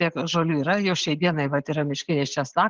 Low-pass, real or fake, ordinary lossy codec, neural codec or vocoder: 7.2 kHz; real; Opus, 16 kbps; none